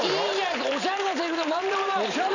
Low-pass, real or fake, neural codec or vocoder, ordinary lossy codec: 7.2 kHz; fake; vocoder, 44.1 kHz, 128 mel bands every 512 samples, BigVGAN v2; none